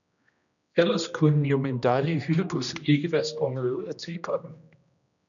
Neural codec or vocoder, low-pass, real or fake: codec, 16 kHz, 1 kbps, X-Codec, HuBERT features, trained on general audio; 7.2 kHz; fake